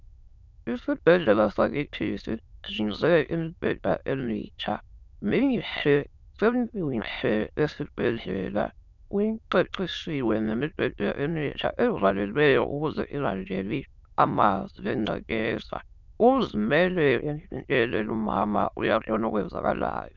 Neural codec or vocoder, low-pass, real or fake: autoencoder, 22.05 kHz, a latent of 192 numbers a frame, VITS, trained on many speakers; 7.2 kHz; fake